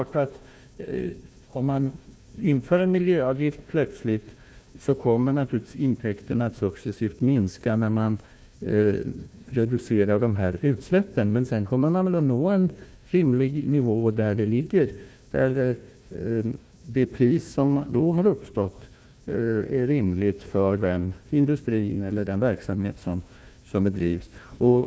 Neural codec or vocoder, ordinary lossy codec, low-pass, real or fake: codec, 16 kHz, 1 kbps, FunCodec, trained on Chinese and English, 50 frames a second; none; none; fake